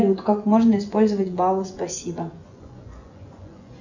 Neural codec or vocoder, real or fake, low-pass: vocoder, 24 kHz, 100 mel bands, Vocos; fake; 7.2 kHz